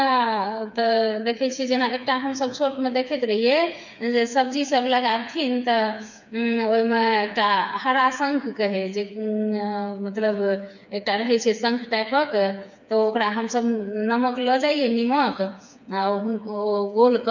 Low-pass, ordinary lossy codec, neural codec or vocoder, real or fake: 7.2 kHz; none; codec, 16 kHz, 4 kbps, FreqCodec, smaller model; fake